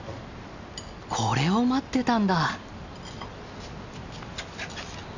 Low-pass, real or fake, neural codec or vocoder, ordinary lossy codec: 7.2 kHz; real; none; none